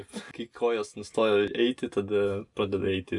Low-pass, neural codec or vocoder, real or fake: 10.8 kHz; none; real